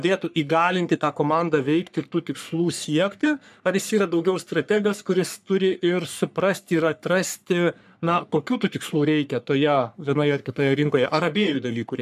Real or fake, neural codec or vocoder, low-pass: fake; codec, 44.1 kHz, 3.4 kbps, Pupu-Codec; 14.4 kHz